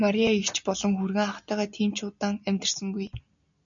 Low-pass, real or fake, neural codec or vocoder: 7.2 kHz; real; none